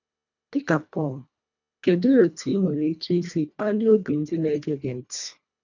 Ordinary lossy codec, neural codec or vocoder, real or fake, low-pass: none; codec, 24 kHz, 1.5 kbps, HILCodec; fake; 7.2 kHz